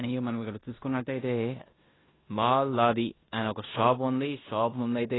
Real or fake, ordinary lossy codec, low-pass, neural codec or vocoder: fake; AAC, 16 kbps; 7.2 kHz; codec, 16 kHz in and 24 kHz out, 0.9 kbps, LongCat-Audio-Codec, four codebook decoder